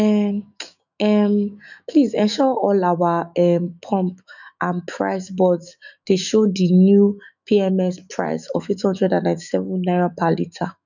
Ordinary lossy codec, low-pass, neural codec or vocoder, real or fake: none; 7.2 kHz; autoencoder, 48 kHz, 128 numbers a frame, DAC-VAE, trained on Japanese speech; fake